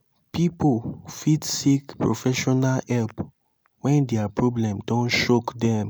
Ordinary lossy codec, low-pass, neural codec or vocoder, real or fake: none; none; none; real